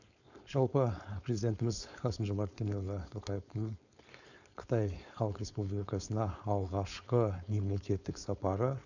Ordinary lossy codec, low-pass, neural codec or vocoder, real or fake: none; 7.2 kHz; codec, 16 kHz, 4.8 kbps, FACodec; fake